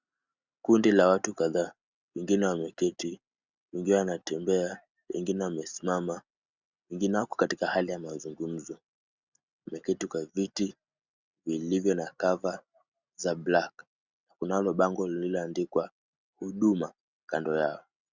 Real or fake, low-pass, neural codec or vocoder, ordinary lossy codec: real; 7.2 kHz; none; Opus, 64 kbps